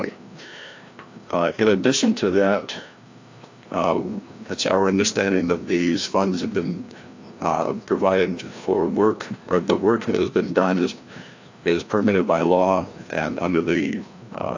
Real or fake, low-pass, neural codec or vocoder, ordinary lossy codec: fake; 7.2 kHz; codec, 16 kHz, 1 kbps, FreqCodec, larger model; AAC, 48 kbps